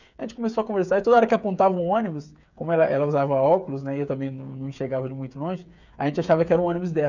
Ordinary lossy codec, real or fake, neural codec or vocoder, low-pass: none; fake; codec, 16 kHz, 8 kbps, FreqCodec, smaller model; 7.2 kHz